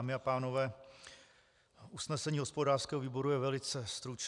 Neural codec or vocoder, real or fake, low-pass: none; real; 10.8 kHz